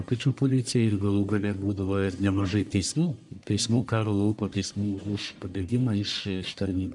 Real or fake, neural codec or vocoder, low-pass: fake; codec, 44.1 kHz, 1.7 kbps, Pupu-Codec; 10.8 kHz